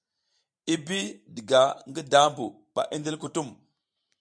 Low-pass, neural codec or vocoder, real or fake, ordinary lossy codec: 9.9 kHz; vocoder, 44.1 kHz, 128 mel bands every 512 samples, BigVGAN v2; fake; MP3, 96 kbps